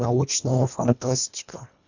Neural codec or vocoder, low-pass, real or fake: codec, 24 kHz, 1.5 kbps, HILCodec; 7.2 kHz; fake